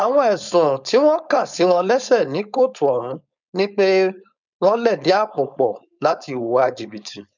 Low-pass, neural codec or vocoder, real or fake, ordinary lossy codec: 7.2 kHz; codec, 16 kHz, 4.8 kbps, FACodec; fake; none